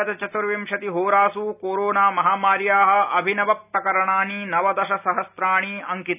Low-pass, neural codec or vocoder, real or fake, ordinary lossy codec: 3.6 kHz; none; real; none